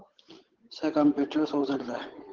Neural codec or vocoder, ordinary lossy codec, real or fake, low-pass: codec, 16 kHz, 8 kbps, FunCodec, trained on Chinese and English, 25 frames a second; Opus, 16 kbps; fake; 7.2 kHz